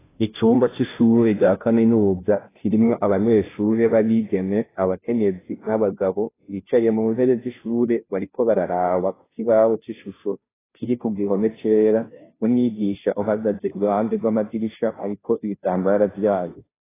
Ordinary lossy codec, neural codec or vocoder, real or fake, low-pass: AAC, 16 kbps; codec, 16 kHz, 0.5 kbps, FunCodec, trained on Chinese and English, 25 frames a second; fake; 3.6 kHz